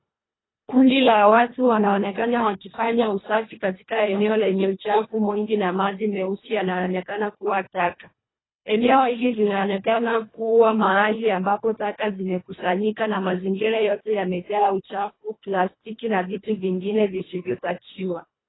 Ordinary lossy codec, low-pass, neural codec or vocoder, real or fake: AAC, 16 kbps; 7.2 kHz; codec, 24 kHz, 1.5 kbps, HILCodec; fake